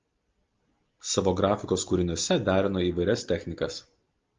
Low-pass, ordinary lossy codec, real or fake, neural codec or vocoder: 7.2 kHz; Opus, 32 kbps; real; none